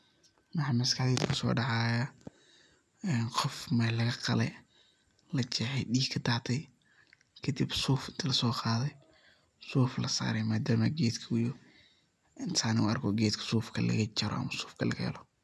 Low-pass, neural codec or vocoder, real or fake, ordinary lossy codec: none; none; real; none